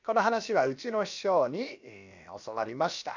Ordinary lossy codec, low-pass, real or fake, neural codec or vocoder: none; 7.2 kHz; fake; codec, 16 kHz, about 1 kbps, DyCAST, with the encoder's durations